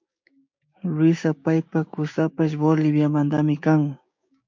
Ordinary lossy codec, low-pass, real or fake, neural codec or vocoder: MP3, 48 kbps; 7.2 kHz; fake; codec, 16 kHz, 6 kbps, DAC